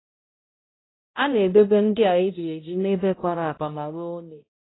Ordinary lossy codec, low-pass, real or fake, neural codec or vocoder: AAC, 16 kbps; 7.2 kHz; fake; codec, 16 kHz, 0.5 kbps, X-Codec, HuBERT features, trained on balanced general audio